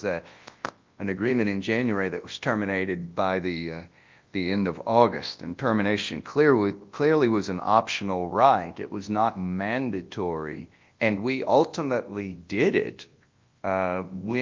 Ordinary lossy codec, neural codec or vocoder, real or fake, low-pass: Opus, 32 kbps; codec, 24 kHz, 0.9 kbps, WavTokenizer, large speech release; fake; 7.2 kHz